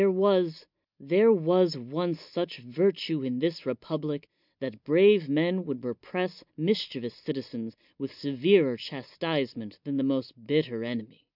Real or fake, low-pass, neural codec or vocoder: real; 5.4 kHz; none